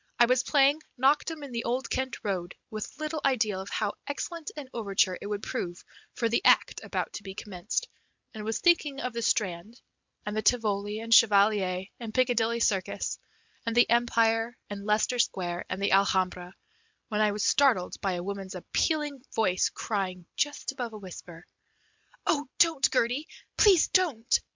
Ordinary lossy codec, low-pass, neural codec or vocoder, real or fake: MP3, 64 kbps; 7.2 kHz; none; real